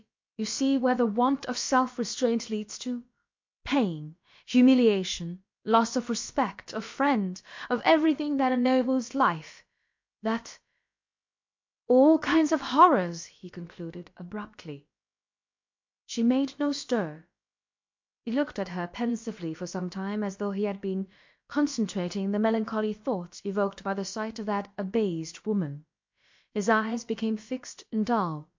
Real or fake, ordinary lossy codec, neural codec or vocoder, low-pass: fake; MP3, 48 kbps; codec, 16 kHz, about 1 kbps, DyCAST, with the encoder's durations; 7.2 kHz